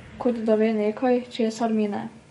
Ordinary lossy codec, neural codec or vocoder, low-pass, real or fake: MP3, 48 kbps; codec, 44.1 kHz, 7.8 kbps, DAC; 19.8 kHz; fake